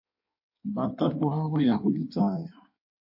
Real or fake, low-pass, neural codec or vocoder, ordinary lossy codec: fake; 5.4 kHz; codec, 16 kHz in and 24 kHz out, 1.1 kbps, FireRedTTS-2 codec; MP3, 32 kbps